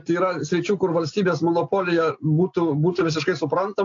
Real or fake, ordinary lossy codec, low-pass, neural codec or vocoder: real; AAC, 48 kbps; 7.2 kHz; none